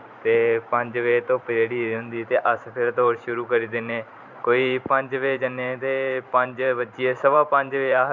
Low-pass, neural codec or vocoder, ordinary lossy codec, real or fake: 7.2 kHz; vocoder, 44.1 kHz, 128 mel bands every 512 samples, BigVGAN v2; none; fake